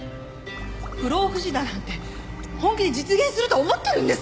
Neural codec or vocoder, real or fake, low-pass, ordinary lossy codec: none; real; none; none